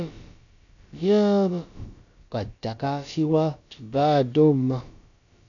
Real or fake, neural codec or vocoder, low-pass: fake; codec, 16 kHz, about 1 kbps, DyCAST, with the encoder's durations; 7.2 kHz